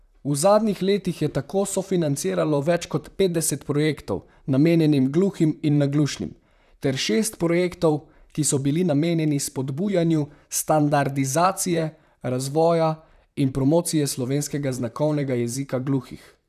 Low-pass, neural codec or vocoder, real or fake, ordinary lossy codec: 14.4 kHz; vocoder, 44.1 kHz, 128 mel bands, Pupu-Vocoder; fake; none